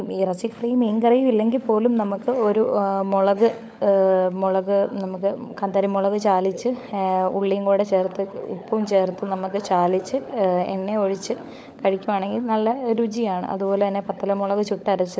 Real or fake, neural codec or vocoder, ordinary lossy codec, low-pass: fake; codec, 16 kHz, 16 kbps, FunCodec, trained on LibriTTS, 50 frames a second; none; none